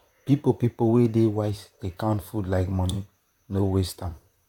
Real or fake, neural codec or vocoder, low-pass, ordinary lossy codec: fake; vocoder, 44.1 kHz, 128 mel bands, Pupu-Vocoder; 19.8 kHz; none